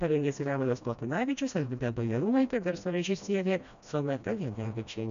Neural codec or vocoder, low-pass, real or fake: codec, 16 kHz, 1 kbps, FreqCodec, smaller model; 7.2 kHz; fake